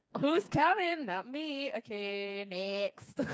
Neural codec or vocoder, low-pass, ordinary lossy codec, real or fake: codec, 16 kHz, 4 kbps, FreqCodec, smaller model; none; none; fake